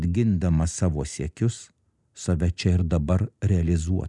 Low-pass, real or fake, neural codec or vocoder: 10.8 kHz; real; none